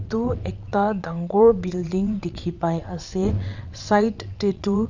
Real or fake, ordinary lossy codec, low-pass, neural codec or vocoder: fake; none; 7.2 kHz; vocoder, 22.05 kHz, 80 mel bands, WaveNeXt